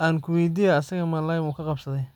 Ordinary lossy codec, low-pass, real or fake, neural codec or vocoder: none; 19.8 kHz; real; none